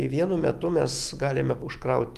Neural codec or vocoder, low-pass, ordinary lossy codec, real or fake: autoencoder, 48 kHz, 128 numbers a frame, DAC-VAE, trained on Japanese speech; 14.4 kHz; Opus, 32 kbps; fake